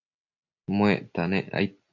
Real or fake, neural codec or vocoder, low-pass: real; none; 7.2 kHz